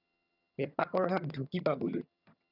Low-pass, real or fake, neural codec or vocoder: 5.4 kHz; fake; vocoder, 22.05 kHz, 80 mel bands, HiFi-GAN